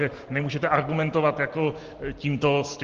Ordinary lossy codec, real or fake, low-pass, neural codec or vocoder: Opus, 16 kbps; real; 7.2 kHz; none